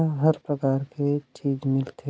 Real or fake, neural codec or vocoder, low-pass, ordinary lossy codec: real; none; none; none